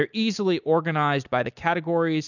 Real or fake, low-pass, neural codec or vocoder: real; 7.2 kHz; none